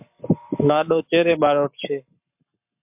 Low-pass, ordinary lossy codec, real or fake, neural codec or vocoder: 3.6 kHz; MP3, 32 kbps; fake; codec, 44.1 kHz, 7.8 kbps, Pupu-Codec